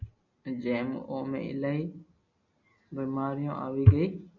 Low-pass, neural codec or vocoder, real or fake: 7.2 kHz; none; real